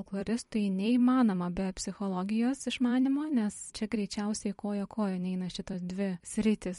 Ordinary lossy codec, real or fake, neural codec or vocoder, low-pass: MP3, 48 kbps; fake; vocoder, 44.1 kHz, 128 mel bands every 256 samples, BigVGAN v2; 19.8 kHz